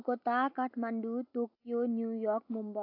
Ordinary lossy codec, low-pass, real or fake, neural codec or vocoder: AAC, 48 kbps; 5.4 kHz; real; none